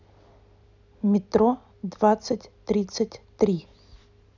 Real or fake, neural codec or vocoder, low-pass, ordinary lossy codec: real; none; 7.2 kHz; none